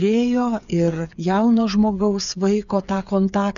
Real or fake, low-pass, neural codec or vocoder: fake; 7.2 kHz; codec, 16 kHz, 8 kbps, FreqCodec, smaller model